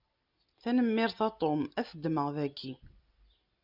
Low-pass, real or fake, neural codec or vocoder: 5.4 kHz; real; none